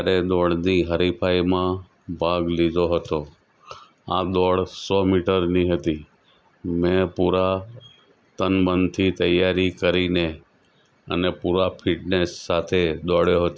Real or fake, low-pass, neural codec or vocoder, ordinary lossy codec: real; none; none; none